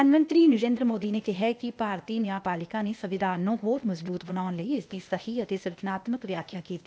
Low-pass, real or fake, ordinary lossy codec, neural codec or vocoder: none; fake; none; codec, 16 kHz, 0.8 kbps, ZipCodec